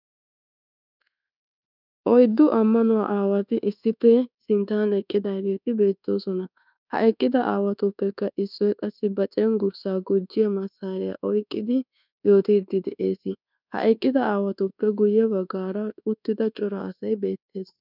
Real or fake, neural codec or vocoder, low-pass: fake; codec, 24 kHz, 1.2 kbps, DualCodec; 5.4 kHz